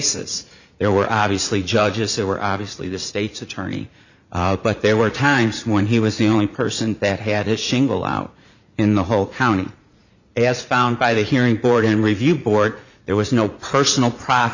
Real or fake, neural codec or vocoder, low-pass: fake; vocoder, 44.1 kHz, 80 mel bands, Vocos; 7.2 kHz